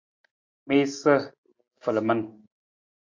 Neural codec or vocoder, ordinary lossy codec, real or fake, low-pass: none; MP3, 48 kbps; real; 7.2 kHz